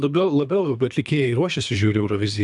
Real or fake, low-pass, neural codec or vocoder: fake; 10.8 kHz; codec, 24 kHz, 3 kbps, HILCodec